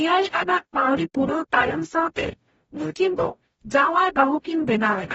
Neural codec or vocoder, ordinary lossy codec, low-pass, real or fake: codec, 44.1 kHz, 0.9 kbps, DAC; AAC, 24 kbps; 19.8 kHz; fake